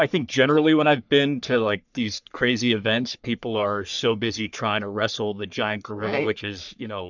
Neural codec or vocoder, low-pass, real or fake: codec, 44.1 kHz, 3.4 kbps, Pupu-Codec; 7.2 kHz; fake